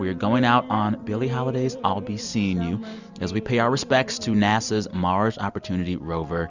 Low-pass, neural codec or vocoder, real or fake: 7.2 kHz; none; real